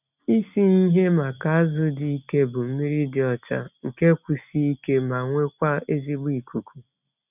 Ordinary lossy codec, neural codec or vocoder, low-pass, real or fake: none; none; 3.6 kHz; real